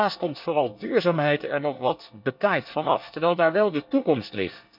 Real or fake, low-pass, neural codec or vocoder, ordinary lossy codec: fake; 5.4 kHz; codec, 24 kHz, 1 kbps, SNAC; none